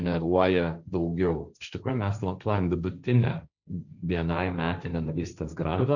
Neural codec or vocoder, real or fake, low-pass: codec, 16 kHz, 1.1 kbps, Voila-Tokenizer; fake; 7.2 kHz